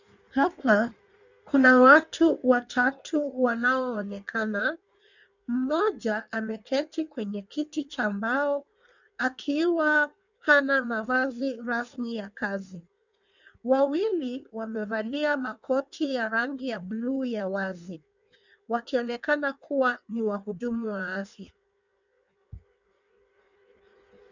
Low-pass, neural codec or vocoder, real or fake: 7.2 kHz; codec, 16 kHz in and 24 kHz out, 1.1 kbps, FireRedTTS-2 codec; fake